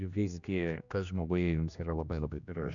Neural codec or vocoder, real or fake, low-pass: codec, 16 kHz, 1 kbps, X-Codec, HuBERT features, trained on general audio; fake; 7.2 kHz